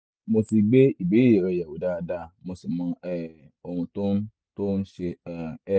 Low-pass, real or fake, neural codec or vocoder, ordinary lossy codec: none; real; none; none